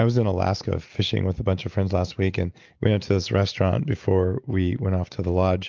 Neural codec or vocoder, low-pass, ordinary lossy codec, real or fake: none; 7.2 kHz; Opus, 32 kbps; real